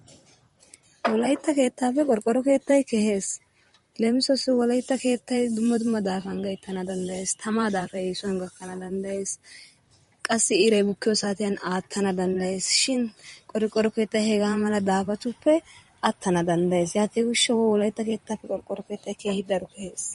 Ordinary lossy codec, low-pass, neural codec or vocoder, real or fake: MP3, 48 kbps; 19.8 kHz; vocoder, 44.1 kHz, 128 mel bands, Pupu-Vocoder; fake